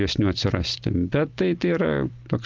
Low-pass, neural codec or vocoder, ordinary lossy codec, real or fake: 7.2 kHz; none; Opus, 16 kbps; real